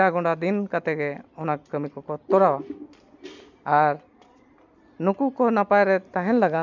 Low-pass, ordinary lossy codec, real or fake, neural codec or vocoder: 7.2 kHz; none; real; none